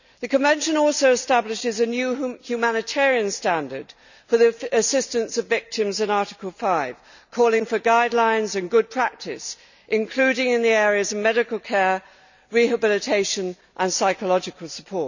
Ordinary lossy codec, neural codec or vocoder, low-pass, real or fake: none; none; 7.2 kHz; real